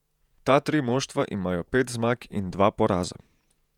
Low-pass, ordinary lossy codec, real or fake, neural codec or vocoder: 19.8 kHz; none; fake; vocoder, 48 kHz, 128 mel bands, Vocos